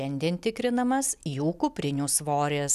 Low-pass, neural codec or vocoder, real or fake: 14.4 kHz; none; real